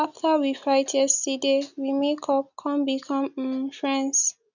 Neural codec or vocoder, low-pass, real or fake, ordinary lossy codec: none; 7.2 kHz; real; none